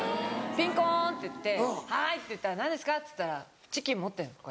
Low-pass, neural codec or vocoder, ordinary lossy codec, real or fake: none; none; none; real